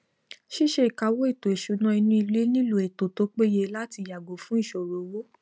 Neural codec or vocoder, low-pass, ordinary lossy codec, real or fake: none; none; none; real